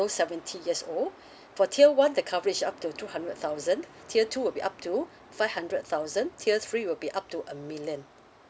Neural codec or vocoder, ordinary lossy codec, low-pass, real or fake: none; none; none; real